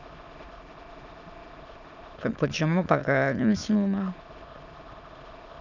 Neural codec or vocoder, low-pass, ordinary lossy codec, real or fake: autoencoder, 22.05 kHz, a latent of 192 numbers a frame, VITS, trained on many speakers; 7.2 kHz; none; fake